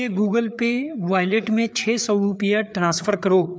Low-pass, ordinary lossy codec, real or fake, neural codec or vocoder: none; none; fake; codec, 16 kHz, 4 kbps, FreqCodec, larger model